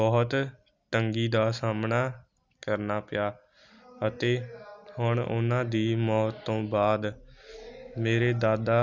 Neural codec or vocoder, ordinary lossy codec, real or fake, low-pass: none; none; real; 7.2 kHz